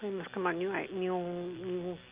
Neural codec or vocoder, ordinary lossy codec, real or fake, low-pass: none; Opus, 24 kbps; real; 3.6 kHz